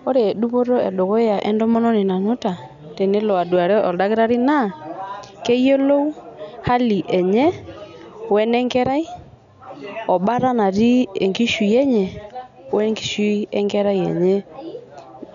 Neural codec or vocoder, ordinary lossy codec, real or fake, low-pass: none; none; real; 7.2 kHz